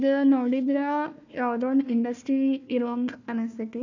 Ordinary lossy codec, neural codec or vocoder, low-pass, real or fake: none; codec, 16 kHz, 1 kbps, FunCodec, trained on Chinese and English, 50 frames a second; 7.2 kHz; fake